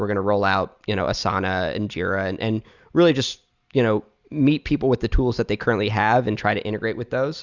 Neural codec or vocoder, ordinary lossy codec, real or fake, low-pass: none; Opus, 64 kbps; real; 7.2 kHz